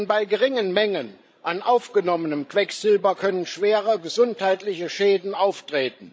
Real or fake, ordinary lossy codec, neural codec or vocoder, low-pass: fake; none; codec, 16 kHz, 16 kbps, FreqCodec, larger model; none